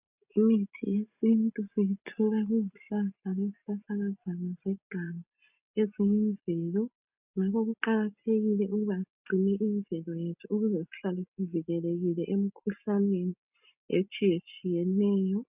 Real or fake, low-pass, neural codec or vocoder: real; 3.6 kHz; none